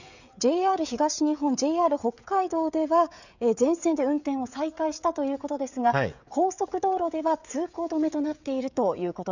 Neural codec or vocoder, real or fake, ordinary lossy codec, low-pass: codec, 16 kHz, 16 kbps, FreqCodec, smaller model; fake; none; 7.2 kHz